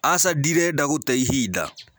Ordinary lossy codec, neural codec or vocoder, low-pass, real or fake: none; none; none; real